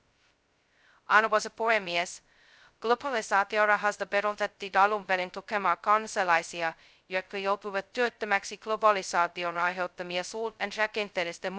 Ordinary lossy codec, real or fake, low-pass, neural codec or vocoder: none; fake; none; codec, 16 kHz, 0.2 kbps, FocalCodec